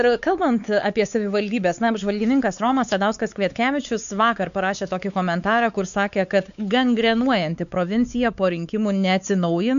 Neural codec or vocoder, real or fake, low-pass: codec, 16 kHz, 4 kbps, X-Codec, WavLM features, trained on Multilingual LibriSpeech; fake; 7.2 kHz